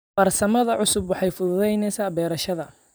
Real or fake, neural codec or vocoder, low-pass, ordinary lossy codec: fake; vocoder, 44.1 kHz, 128 mel bands every 256 samples, BigVGAN v2; none; none